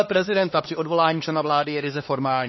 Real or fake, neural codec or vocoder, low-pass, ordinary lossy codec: fake; codec, 16 kHz, 2 kbps, X-Codec, HuBERT features, trained on LibriSpeech; 7.2 kHz; MP3, 24 kbps